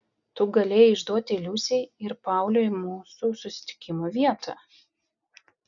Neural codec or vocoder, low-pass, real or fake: none; 7.2 kHz; real